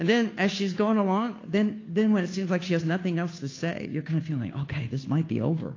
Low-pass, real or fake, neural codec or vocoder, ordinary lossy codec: 7.2 kHz; fake; codec, 16 kHz, 2 kbps, FunCodec, trained on Chinese and English, 25 frames a second; AAC, 32 kbps